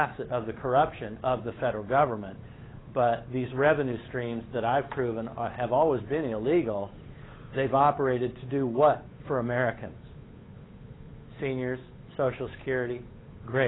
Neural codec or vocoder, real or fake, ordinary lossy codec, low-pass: codec, 16 kHz, 8 kbps, FunCodec, trained on Chinese and English, 25 frames a second; fake; AAC, 16 kbps; 7.2 kHz